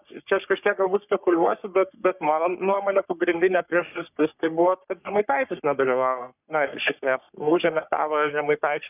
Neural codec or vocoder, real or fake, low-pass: codec, 44.1 kHz, 3.4 kbps, Pupu-Codec; fake; 3.6 kHz